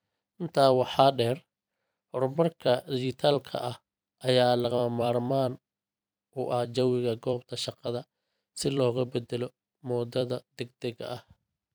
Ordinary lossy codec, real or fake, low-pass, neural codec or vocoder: none; fake; none; vocoder, 44.1 kHz, 128 mel bands every 256 samples, BigVGAN v2